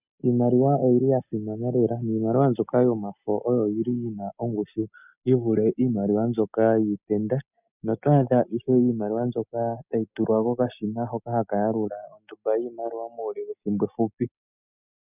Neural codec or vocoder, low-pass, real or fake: none; 3.6 kHz; real